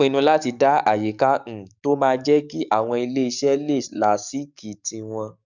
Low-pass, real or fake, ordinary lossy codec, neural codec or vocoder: 7.2 kHz; fake; none; codec, 44.1 kHz, 7.8 kbps, DAC